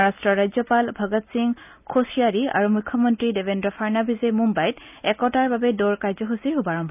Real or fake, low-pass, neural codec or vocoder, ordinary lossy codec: real; 3.6 kHz; none; none